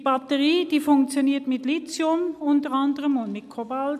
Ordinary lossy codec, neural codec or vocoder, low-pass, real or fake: none; none; 14.4 kHz; real